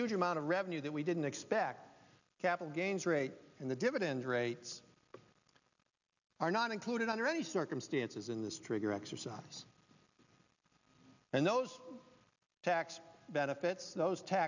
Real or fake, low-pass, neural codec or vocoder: real; 7.2 kHz; none